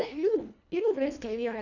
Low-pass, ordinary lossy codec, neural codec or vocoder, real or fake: 7.2 kHz; none; codec, 24 kHz, 1.5 kbps, HILCodec; fake